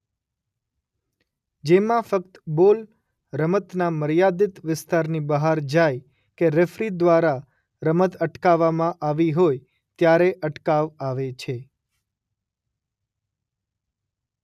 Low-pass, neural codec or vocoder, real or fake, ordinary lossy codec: 14.4 kHz; none; real; none